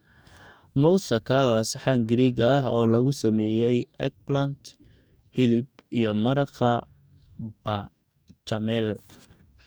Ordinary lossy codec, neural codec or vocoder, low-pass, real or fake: none; codec, 44.1 kHz, 2.6 kbps, DAC; none; fake